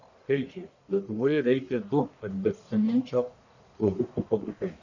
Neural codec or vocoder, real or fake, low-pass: codec, 44.1 kHz, 1.7 kbps, Pupu-Codec; fake; 7.2 kHz